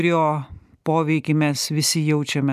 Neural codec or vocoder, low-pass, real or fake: none; 14.4 kHz; real